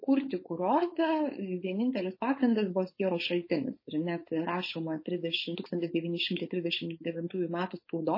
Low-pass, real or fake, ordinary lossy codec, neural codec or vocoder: 5.4 kHz; fake; MP3, 24 kbps; codec, 16 kHz, 4.8 kbps, FACodec